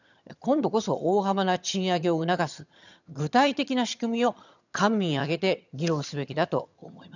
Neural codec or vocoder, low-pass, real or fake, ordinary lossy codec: vocoder, 22.05 kHz, 80 mel bands, HiFi-GAN; 7.2 kHz; fake; none